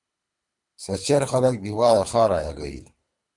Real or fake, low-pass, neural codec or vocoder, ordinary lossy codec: fake; 10.8 kHz; codec, 24 kHz, 3 kbps, HILCodec; MP3, 96 kbps